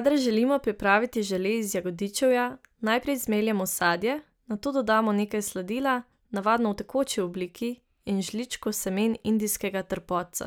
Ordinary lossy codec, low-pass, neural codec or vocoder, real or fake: none; none; none; real